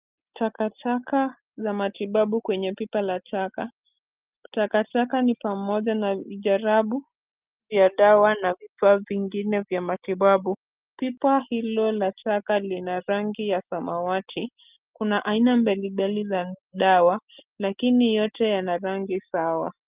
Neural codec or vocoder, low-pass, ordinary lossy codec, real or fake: none; 3.6 kHz; Opus, 24 kbps; real